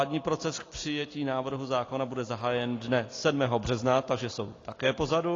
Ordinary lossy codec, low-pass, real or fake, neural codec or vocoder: AAC, 32 kbps; 7.2 kHz; real; none